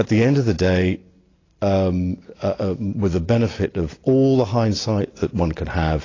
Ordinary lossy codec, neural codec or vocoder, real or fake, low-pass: AAC, 32 kbps; none; real; 7.2 kHz